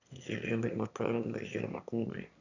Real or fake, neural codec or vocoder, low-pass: fake; autoencoder, 22.05 kHz, a latent of 192 numbers a frame, VITS, trained on one speaker; 7.2 kHz